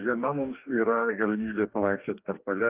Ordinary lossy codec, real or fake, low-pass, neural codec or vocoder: Opus, 24 kbps; fake; 3.6 kHz; codec, 44.1 kHz, 2.6 kbps, DAC